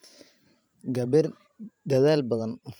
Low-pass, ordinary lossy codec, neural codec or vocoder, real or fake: none; none; vocoder, 44.1 kHz, 128 mel bands every 512 samples, BigVGAN v2; fake